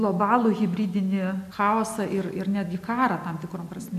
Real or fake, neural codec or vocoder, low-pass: real; none; 14.4 kHz